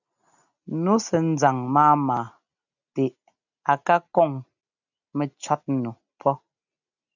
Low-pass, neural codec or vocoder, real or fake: 7.2 kHz; none; real